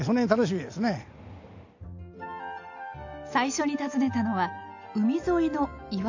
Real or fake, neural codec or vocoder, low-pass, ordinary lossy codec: real; none; 7.2 kHz; AAC, 48 kbps